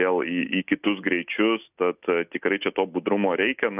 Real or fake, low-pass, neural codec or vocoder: real; 3.6 kHz; none